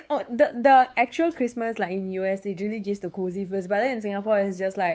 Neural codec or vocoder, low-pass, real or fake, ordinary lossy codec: codec, 16 kHz, 4 kbps, X-Codec, WavLM features, trained on Multilingual LibriSpeech; none; fake; none